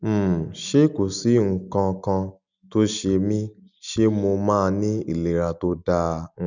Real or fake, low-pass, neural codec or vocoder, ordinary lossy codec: real; 7.2 kHz; none; none